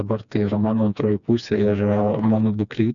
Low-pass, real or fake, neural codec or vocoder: 7.2 kHz; fake; codec, 16 kHz, 2 kbps, FreqCodec, smaller model